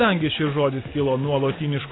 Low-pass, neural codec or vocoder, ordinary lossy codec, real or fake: 7.2 kHz; none; AAC, 16 kbps; real